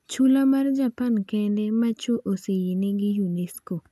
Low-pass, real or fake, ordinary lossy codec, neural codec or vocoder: 14.4 kHz; real; AAC, 96 kbps; none